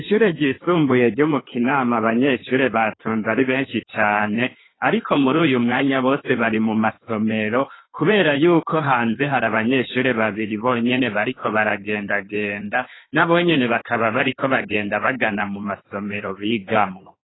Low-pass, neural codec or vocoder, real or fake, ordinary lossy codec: 7.2 kHz; codec, 16 kHz in and 24 kHz out, 1.1 kbps, FireRedTTS-2 codec; fake; AAC, 16 kbps